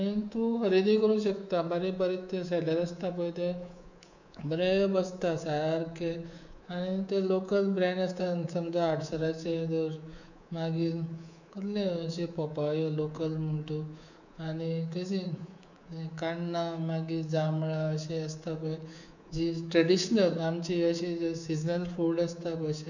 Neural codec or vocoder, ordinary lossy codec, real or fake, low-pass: codec, 24 kHz, 3.1 kbps, DualCodec; none; fake; 7.2 kHz